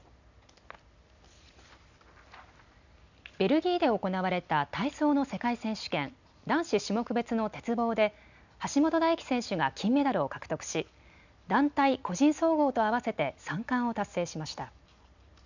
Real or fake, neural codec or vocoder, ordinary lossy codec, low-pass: real; none; none; 7.2 kHz